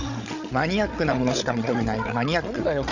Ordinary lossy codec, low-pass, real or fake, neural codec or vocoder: none; 7.2 kHz; fake; codec, 16 kHz, 16 kbps, FreqCodec, larger model